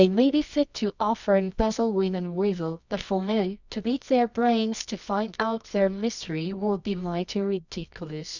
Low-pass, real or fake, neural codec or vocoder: 7.2 kHz; fake; codec, 24 kHz, 0.9 kbps, WavTokenizer, medium music audio release